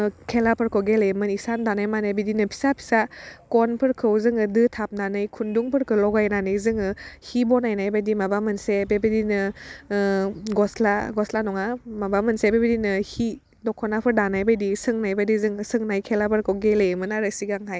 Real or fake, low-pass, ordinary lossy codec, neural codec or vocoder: real; none; none; none